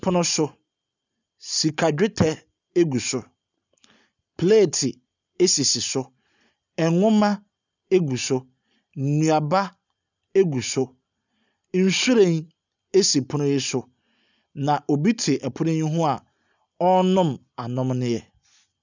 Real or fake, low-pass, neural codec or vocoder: real; 7.2 kHz; none